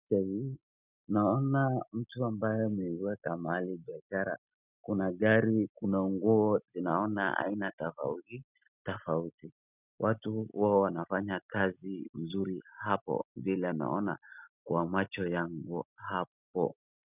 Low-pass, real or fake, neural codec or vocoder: 3.6 kHz; real; none